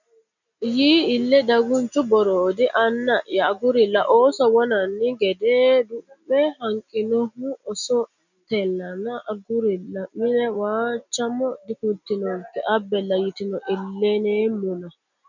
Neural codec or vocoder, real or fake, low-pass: none; real; 7.2 kHz